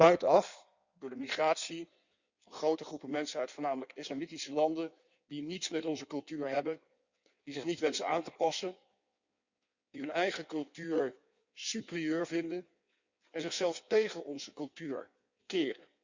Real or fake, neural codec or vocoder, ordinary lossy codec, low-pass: fake; codec, 16 kHz in and 24 kHz out, 1.1 kbps, FireRedTTS-2 codec; Opus, 64 kbps; 7.2 kHz